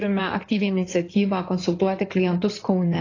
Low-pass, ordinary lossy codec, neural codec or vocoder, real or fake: 7.2 kHz; AAC, 32 kbps; codec, 16 kHz in and 24 kHz out, 2.2 kbps, FireRedTTS-2 codec; fake